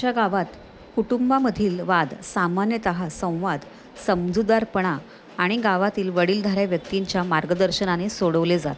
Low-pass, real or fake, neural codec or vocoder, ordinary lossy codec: none; real; none; none